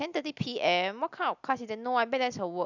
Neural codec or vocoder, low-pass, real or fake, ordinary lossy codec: none; 7.2 kHz; real; none